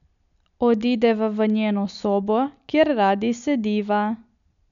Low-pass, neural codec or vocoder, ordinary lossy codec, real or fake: 7.2 kHz; none; none; real